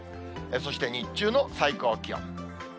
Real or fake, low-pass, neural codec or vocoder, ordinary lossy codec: real; none; none; none